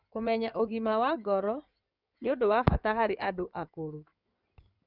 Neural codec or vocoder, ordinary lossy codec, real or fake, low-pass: codec, 16 kHz in and 24 kHz out, 2.2 kbps, FireRedTTS-2 codec; none; fake; 5.4 kHz